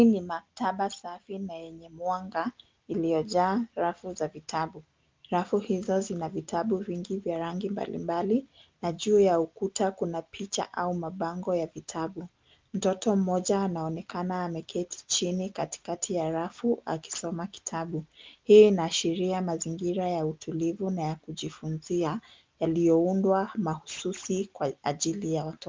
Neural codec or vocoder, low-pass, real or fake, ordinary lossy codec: none; 7.2 kHz; real; Opus, 32 kbps